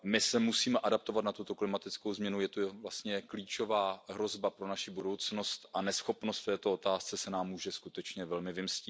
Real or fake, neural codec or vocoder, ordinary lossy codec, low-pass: real; none; none; none